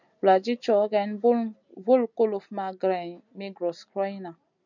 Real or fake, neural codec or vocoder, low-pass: real; none; 7.2 kHz